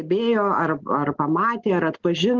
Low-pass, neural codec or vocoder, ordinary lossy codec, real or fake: 7.2 kHz; none; Opus, 16 kbps; real